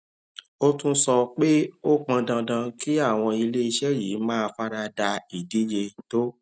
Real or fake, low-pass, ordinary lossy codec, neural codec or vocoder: real; none; none; none